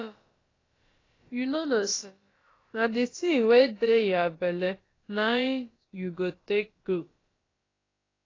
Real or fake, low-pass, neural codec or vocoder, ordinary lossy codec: fake; 7.2 kHz; codec, 16 kHz, about 1 kbps, DyCAST, with the encoder's durations; AAC, 32 kbps